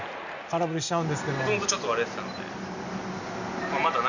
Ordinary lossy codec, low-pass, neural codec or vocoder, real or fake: none; 7.2 kHz; none; real